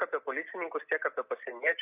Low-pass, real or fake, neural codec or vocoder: 3.6 kHz; real; none